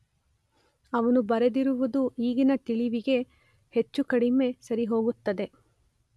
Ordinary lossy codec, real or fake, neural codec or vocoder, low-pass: none; real; none; none